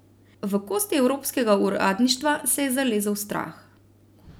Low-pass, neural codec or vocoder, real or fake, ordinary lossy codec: none; none; real; none